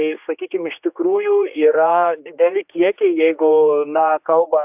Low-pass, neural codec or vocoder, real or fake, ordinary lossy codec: 3.6 kHz; codec, 16 kHz, 2 kbps, X-Codec, HuBERT features, trained on general audio; fake; AAC, 32 kbps